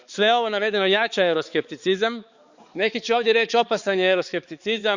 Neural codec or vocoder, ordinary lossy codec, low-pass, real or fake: codec, 16 kHz, 4 kbps, X-Codec, HuBERT features, trained on balanced general audio; Opus, 64 kbps; 7.2 kHz; fake